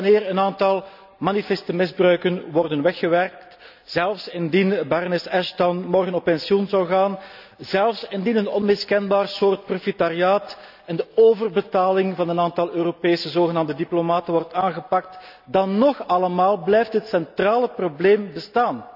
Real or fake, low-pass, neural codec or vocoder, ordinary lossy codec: real; 5.4 kHz; none; none